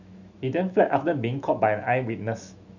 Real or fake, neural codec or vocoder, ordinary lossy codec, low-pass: fake; autoencoder, 48 kHz, 128 numbers a frame, DAC-VAE, trained on Japanese speech; none; 7.2 kHz